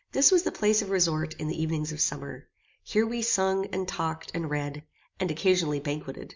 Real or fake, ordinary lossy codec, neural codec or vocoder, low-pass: real; MP3, 64 kbps; none; 7.2 kHz